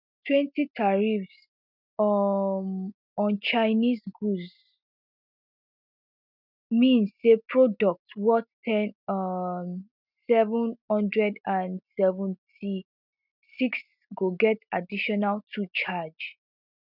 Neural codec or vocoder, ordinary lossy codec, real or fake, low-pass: none; none; real; 5.4 kHz